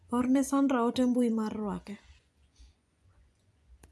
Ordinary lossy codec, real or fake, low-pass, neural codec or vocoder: none; fake; none; vocoder, 24 kHz, 100 mel bands, Vocos